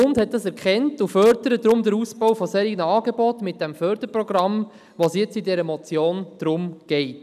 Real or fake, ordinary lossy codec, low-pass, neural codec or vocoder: real; none; 14.4 kHz; none